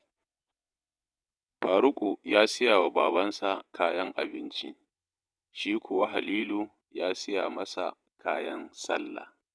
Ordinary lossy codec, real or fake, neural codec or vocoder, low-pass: none; fake; vocoder, 22.05 kHz, 80 mel bands, WaveNeXt; none